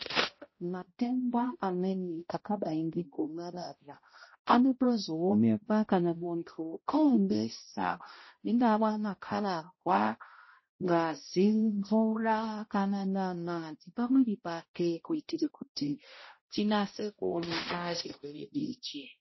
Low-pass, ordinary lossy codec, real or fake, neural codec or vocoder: 7.2 kHz; MP3, 24 kbps; fake; codec, 16 kHz, 0.5 kbps, X-Codec, HuBERT features, trained on balanced general audio